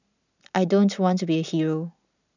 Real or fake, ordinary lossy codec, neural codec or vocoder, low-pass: real; none; none; 7.2 kHz